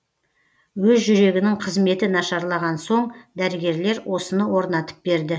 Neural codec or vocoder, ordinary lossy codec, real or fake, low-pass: none; none; real; none